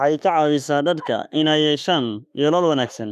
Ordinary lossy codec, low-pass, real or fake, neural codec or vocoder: none; 14.4 kHz; fake; autoencoder, 48 kHz, 32 numbers a frame, DAC-VAE, trained on Japanese speech